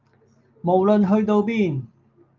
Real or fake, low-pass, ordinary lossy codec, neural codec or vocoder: real; 7.2 kHz; Opus, 24 kbps; none